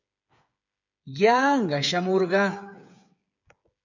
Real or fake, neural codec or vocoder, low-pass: fake; codec, 16 kHz, 8 kbps, FreqCodec, smaller model; 7.2 kHz